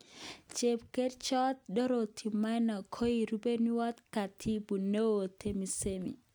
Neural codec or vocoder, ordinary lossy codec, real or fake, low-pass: none; none; real; none